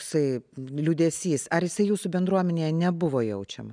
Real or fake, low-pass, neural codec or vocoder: real; 9.9 kHz; none